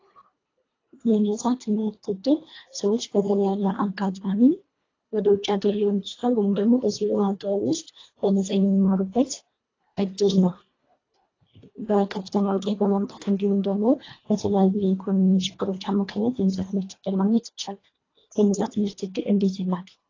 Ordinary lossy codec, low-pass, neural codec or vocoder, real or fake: AAC, 32 kbps; 7.2 kHz; codec, 24 kHz, 1.5 kbps, HILCodec; fake